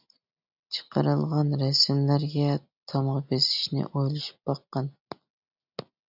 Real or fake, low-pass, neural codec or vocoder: real; 5.4 kHz; none